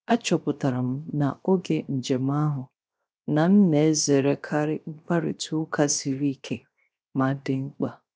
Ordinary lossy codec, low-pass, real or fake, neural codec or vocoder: none; none; fake; codec, 16 kHz, 0.7 kbps, FocalCodec